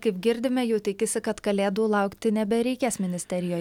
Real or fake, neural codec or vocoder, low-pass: real; none; 19.8 kHz